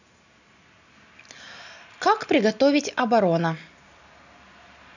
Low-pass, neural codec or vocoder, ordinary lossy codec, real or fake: 7.2 kHz; none; none; real